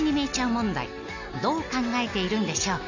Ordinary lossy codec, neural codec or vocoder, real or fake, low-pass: none; none; real; 7.2 kHz